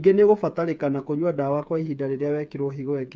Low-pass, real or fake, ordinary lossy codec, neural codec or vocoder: none; fake; none; codec, 16 kHz, 8 kbps, FreqCodec, smaller model